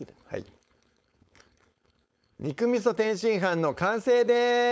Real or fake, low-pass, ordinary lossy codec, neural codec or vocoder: fake; none; none; codec, 16 kHz, 4.8 kbps, FACodec